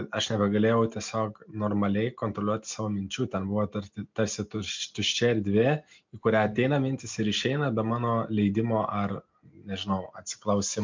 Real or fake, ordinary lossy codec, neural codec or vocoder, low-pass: real; MP3, 64 kbps; none; 7.2 kHz